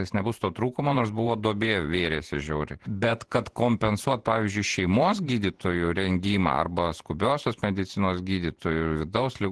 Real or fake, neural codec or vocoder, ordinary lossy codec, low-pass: fake; vocoder, 48 kHz, 128 mel bands, Vocos; Opus, 16 kbps; 10.8 kHz